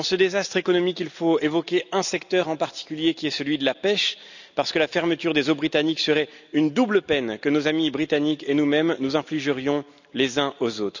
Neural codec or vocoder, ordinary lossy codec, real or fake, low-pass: none; none; real; 7.2 kHz